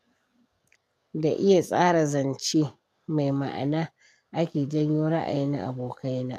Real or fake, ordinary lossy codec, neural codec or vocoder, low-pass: fake; MP3, 96 kbps; codec, 44.1 kHz, 7.8 kbps, DAC; 14.4 kHz